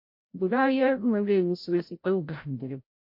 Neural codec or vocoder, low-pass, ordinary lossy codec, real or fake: codec, 16 kHz, 0.5 kbps, FreqCodec, larger model; 5.4 kHz; MP3, 32 kbps; fake